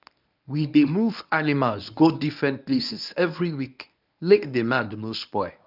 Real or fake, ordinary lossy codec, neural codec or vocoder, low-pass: fake; none; codec, 24 kHz, 0.9 kbps, WavTokenizer, medium speech release version 1; 5.4 kHz